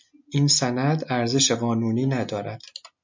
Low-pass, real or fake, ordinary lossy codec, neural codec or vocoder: 7.2 kHz; real; MP3, 64 kbps; none